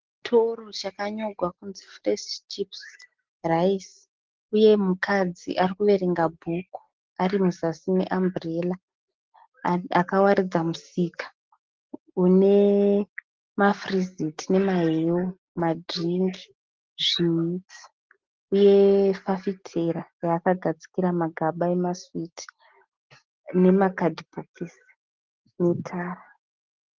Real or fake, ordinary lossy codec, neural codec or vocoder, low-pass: real; Opus, 16 kbps; none; 7.2 kHz